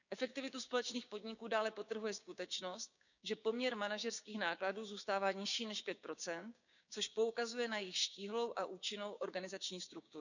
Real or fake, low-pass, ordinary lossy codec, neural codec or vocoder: fake; 7.2 kHz; none; codec, 16 kHz, 6 kbps, DAC